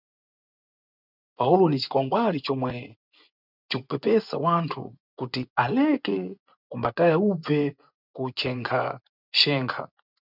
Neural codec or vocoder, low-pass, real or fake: vocoder, 24 kHz, 100 mel bands, Vocos; 5.4 kHz; fake